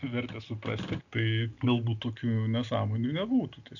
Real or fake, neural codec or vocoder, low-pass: real; none; 7.2 kHz